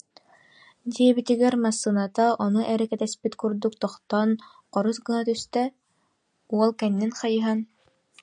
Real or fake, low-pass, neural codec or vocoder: real; 9.9 kHz; none